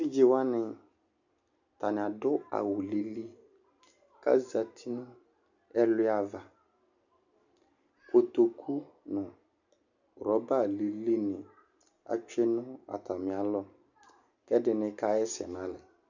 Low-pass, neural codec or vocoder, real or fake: 7.2 kHz; none; real